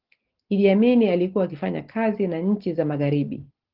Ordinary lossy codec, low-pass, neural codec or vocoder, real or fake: Opus, 16 kbps; 5.4 kHz; none; real